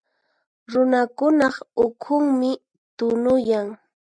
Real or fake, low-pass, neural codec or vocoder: real; 9.9 kHz; none